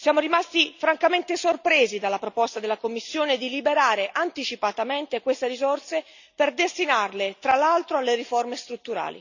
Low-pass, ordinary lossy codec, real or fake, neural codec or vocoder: 7.2 kHz; none; real; none